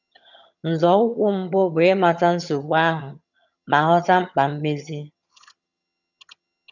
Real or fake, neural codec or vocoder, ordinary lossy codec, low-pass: fake; vocoder, 22.05 kHz, 80 mel bands, HiFi-GAN; none; 7.2 kHz